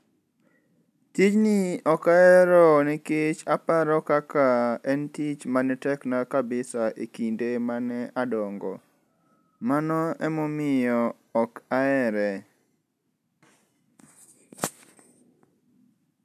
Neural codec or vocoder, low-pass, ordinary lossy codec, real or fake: none; 14.4 kHz; none; real